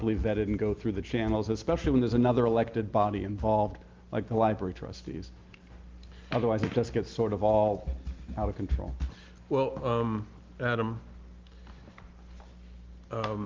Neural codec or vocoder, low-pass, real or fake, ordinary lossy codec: none; 7.2 kHz; real; Opus, 32 kbps